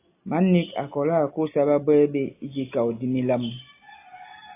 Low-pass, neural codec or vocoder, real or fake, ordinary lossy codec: 3.6 kHz; none; real; AAC, 24 kbps